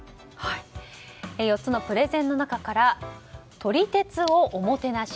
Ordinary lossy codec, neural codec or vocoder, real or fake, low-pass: none; none; real; none